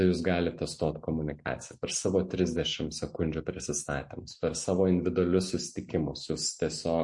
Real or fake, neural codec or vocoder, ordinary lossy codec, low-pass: real; none; MP3, 48 kbps; 10.8 kHz